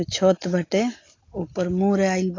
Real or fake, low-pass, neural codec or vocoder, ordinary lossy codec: real; 7.2 kHz; none; AAC, 32 kbps